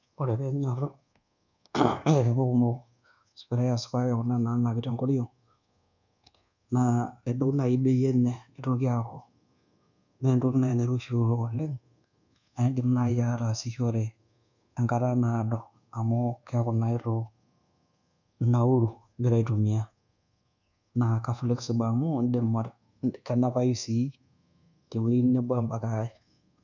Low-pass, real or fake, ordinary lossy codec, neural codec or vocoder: 7.2 kHz; fake; none; codec, 24 kHz, 1.2 kbps, DualCodec